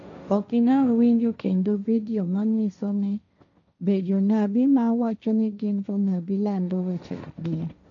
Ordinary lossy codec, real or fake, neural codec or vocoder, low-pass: none; fake; codec, 16 kHz, 1.1 kbps, Voila-Tokenizer; 7.2 kHz